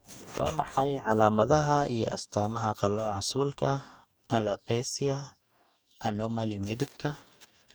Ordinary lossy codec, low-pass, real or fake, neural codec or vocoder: none; none; fake; codec, 44.1 kHz, 2.6 kbps, DAC